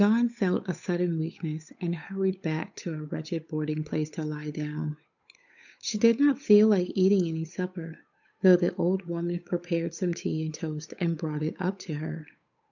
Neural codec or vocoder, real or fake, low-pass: codec, 16 kHz, 8 kbps, FunCodec, trained on Chinese and English, 25 frames a second; fake; 7.2 kHz